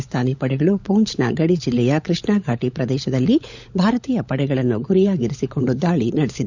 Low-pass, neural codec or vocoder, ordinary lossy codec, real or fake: 7.2 kHz; codec, 16 kHz, 16 kbps, FunCodec, trained on LibriTTS, 50 frames a second; none; fake